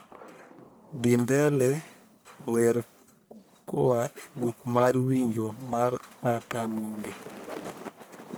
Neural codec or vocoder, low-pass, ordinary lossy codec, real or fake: codec, 44.1 kHz, 1.7 kbps, Pupu-Codec; none; none; fake